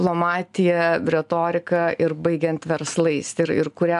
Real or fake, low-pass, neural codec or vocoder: real; 10.8 kHz; none